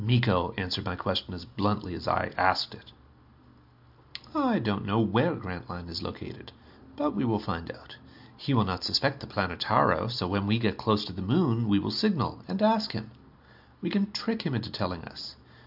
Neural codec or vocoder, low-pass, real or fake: none; 5.4 kHz; real